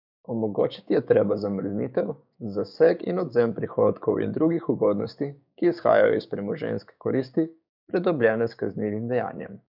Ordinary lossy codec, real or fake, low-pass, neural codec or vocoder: none; fake; 5.4 kHz; codec, 16 kHz, 6 kbps, DAC